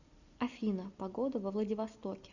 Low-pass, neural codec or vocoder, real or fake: 7.2 kHz; none; real